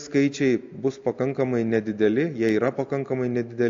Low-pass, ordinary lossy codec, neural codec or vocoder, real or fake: 7.2 kHz; AAC, 48 kbps; none; real